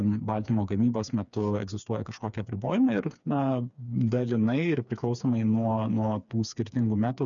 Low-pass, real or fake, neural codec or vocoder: 7.2 kHz; fake; codec, 16 kHz, 4 kbps, FreqCodec, smaller model